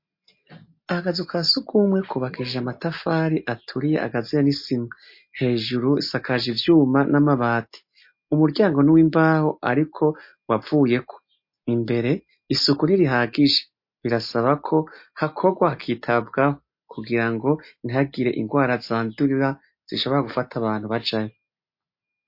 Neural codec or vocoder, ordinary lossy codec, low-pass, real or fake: none; MP3, 32 kbps; 5.4 kHz; real